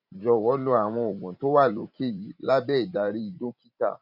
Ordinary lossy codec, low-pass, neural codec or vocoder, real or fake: none; 5.4 kHz; vocoder, 44.1 kHz, 128 mel bands every 512 samples, BigVGAN v2; fake